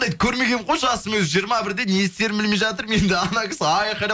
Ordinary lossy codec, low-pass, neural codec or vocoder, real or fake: none; none; none; real